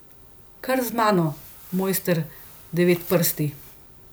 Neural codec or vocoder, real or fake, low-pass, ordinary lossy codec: none; real; none; none